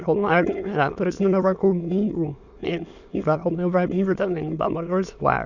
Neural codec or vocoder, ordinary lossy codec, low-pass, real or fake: autoencoder, 22.05 kHz, a latent of 192 numbers a frame, VITS, trained on many speakers; none; 7.2 kHz; fake